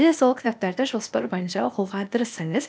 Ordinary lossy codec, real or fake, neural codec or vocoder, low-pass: none; fake; codec, 16 kHz, 0.8 kbps, ZipCodec; none